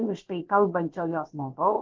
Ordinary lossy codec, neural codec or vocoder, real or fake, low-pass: Opus, 16 kbps; codec, 16 kHz, about 1 kbps, DyCAST, with the encoder's durations; fake; 7.2 kHz